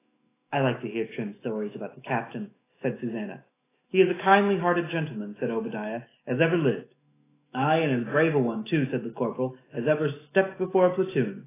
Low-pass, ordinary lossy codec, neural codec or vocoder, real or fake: 3.6 kHz; AAC, 16 kbps; autoencoder, 48 kHz, 128 numbers a frame, DAC-VAE, trained on Japanese speech; fake